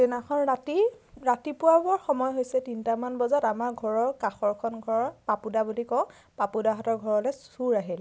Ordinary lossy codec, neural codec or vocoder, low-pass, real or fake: none; none; none; real